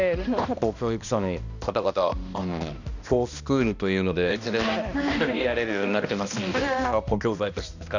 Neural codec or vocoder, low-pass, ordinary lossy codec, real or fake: codec, 16 kHz, 1 kbps, X-Codec, HuBERT features, trained on balanced general audio; 7.2 kHz; none; fake